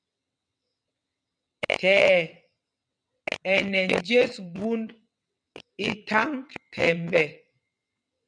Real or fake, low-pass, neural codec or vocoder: fake; 9.9 kHz; vocoder, 22.05 kHz, 80 mel bands, WaveNeXt